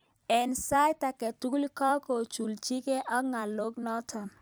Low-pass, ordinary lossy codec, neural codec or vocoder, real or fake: none; none; vocoder, 44.1 kHz, 128 mel bands every 256 samples, BigVGAN v2; fake